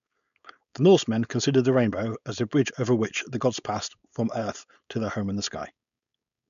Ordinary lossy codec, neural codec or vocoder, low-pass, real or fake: none; codec, 16 kHz, 4.8 kbps, FACodec; 7.2 kHz; fake